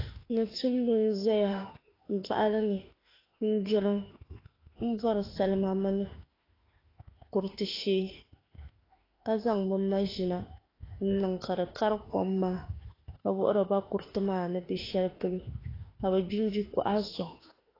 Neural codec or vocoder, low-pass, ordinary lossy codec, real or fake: autoencoder, 48 kHz, 32 numbers a frame, DAC-VAE, trained on Japanese speech; 5.4 kHz; AAC, 24 kbps; fake